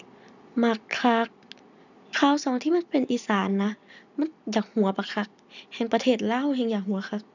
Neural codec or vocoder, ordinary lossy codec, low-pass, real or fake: none; none; 7.2 kHz; real